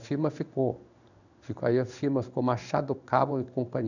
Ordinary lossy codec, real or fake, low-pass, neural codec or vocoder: none; fake; 7.2 kHz; codec, 16 kHz in and 24 kHz out, 1 kbps, XY-Tokenizer